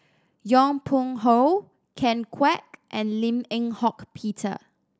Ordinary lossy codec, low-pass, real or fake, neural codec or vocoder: none; none; real; none